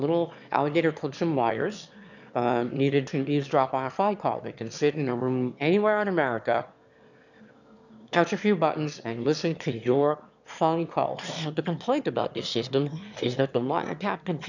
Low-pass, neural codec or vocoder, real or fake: 7.2 kHz; autoencoder, 22.05 kHz, a latent of 192 numbers a frame, VITS, trained on one speaker; fake